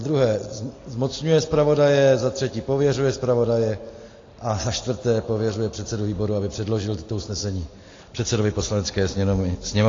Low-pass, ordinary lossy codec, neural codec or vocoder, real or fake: 7.2 kHz; AAC, 32 kbps; none; real